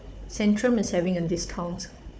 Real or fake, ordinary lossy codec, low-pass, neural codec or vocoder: fake; none; none; codec, 16 kHz, 4 kbps, FunCodec, trained on Chinese and English, 50 frames a second